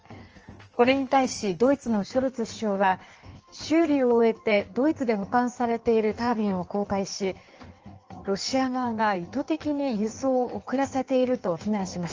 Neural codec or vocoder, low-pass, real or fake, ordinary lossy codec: codec, 16 kHz in and 24 kHz out, 1.1 kbps, FireRedTTS-2 codec; 7.2 kHz; fake; Opus, 24 kbps